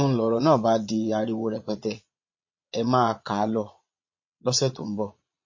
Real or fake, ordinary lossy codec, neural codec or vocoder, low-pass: fake; MP3, 32 kbps; vocoder, 22.05 kHz, 80 mel bands, Vocos; 7.2 kHz